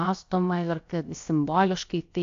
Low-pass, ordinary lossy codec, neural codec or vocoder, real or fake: 7.2 kHz; AAC, 48 kbps; codec, 16 kHz, about 1 kbps, DyCAST, with the encoder's durations; fake